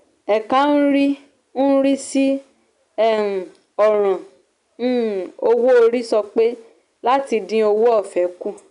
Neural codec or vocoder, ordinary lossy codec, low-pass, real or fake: none; none; 10.8 kHz; real